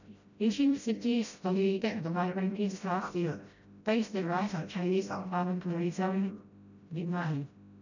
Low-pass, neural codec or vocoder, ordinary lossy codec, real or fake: 7.2 kHz; codec, 16 kHz, 0.5 kbps, FreqCodec, smaller model; none; fake